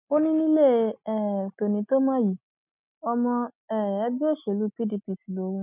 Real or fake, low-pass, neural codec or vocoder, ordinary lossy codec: real; 3.6 kHz; none; none